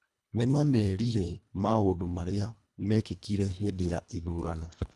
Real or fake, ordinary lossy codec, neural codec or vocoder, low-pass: fake; none; codec, 24 kHz, 1.5 kbps, HILCodec; none